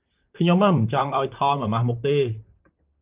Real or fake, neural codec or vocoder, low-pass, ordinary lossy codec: fake; vocoder, 44.1 kHz, 128 mel bands, Pupu-Vocoder; 3.6 kHz; Opus, 24 kbps